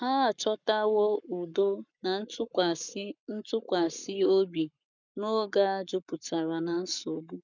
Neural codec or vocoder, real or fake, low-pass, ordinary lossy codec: codec, 44.1 kHz, 7.8 kbps, Pupu-Codec; fake; 7.2 kHz; none